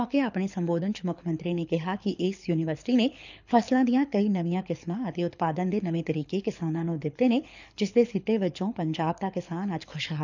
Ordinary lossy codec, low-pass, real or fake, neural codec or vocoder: none; 7.2 kHz; fake; codec, 24 kHz, 6 kbps, HILCodec